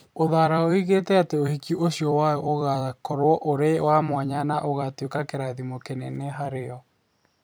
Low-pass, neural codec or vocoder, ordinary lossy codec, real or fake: none; vocoder, 44.1 kHz, 128 mel bands every 256 samples, BigVGAN v2; none; fake